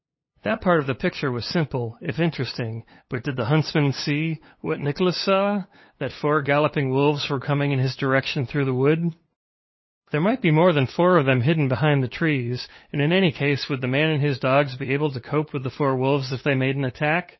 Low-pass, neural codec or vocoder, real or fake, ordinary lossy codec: 7.2 kHz; codec, 16 kHz, 8 kbps, FunCodec, trained on LibriTTS, 25 frames a second; fake; MP3, 24 kbps